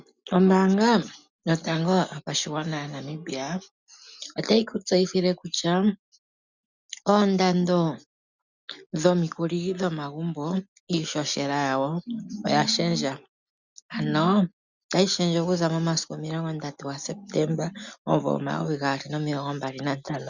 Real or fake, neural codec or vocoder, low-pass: real; none; 7.2 kHz